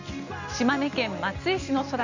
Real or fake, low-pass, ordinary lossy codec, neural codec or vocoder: real; 7.2 kHz; none; none